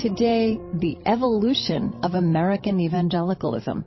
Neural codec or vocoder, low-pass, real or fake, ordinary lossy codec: codec, 16 kHz, 16 kbps, FreqCodec, larger model; 7.2 kHz; fake; MP3, 24 kbps